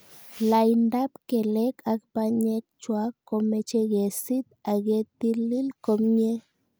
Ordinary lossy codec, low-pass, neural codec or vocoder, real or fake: none; none; none; real